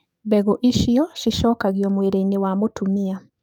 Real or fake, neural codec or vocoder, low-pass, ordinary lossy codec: fake; codec, 44.1 kHz, 7.8 kbps, DAC; 19.8 kHz; none